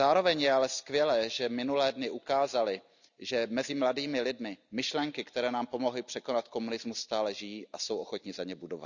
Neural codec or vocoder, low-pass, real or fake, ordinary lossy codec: none; 7.2 kHz; real; none